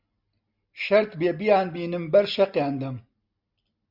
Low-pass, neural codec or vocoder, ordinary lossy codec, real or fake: 5.4 kHz; none; Opus, 64 kbps; real